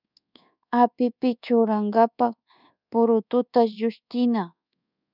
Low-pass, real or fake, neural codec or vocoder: 5.4 kHz; fake; codec, 24 kHz, 1.2 kbps, DualCodec